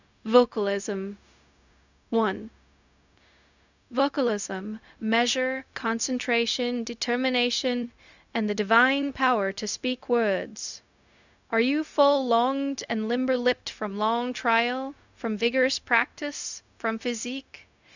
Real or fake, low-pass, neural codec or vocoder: fake; 7.2 kHz; codec, 16 kHz, 0.4 kbps, LongCat-Audio-Codec